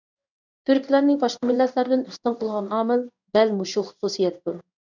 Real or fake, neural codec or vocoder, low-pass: fake; codec, 16 kHz in and 24 kHz out, 1 kbps, XY-Tokenizer; 7.2 kHz